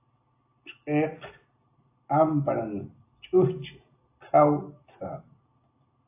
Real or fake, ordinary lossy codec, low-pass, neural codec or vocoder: real; AAC, 24 kbps; 3.6 kHz; none